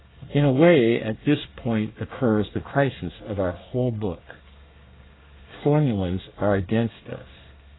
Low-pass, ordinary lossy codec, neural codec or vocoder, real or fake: 7.2 kHz; AAC, 16 kbps; codec, 24 kHz, 1 kbps, SNAC; fake